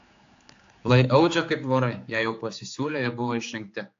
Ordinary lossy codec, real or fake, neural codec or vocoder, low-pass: AAC, 48 kbps; fake; codec, 16 kHz, 4 kbps, X-Codec, HuBERT features, trained on general audio; 7.2 kHz